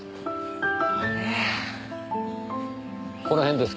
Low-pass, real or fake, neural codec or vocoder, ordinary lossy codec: none; real; none; none